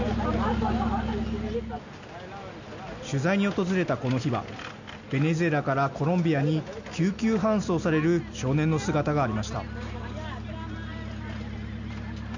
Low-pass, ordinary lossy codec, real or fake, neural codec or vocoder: 7.2 kHz; none; real; none